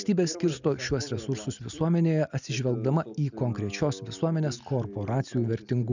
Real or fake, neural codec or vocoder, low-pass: real; none; 7.2 kHz